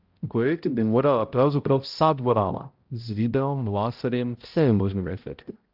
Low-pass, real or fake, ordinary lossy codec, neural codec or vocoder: 5.4 kHz; fake; Opus, 32 kbps; codec, 16 kHz, 0.5 kbps, X-Codec, HuBERT features, trained on balanced general audio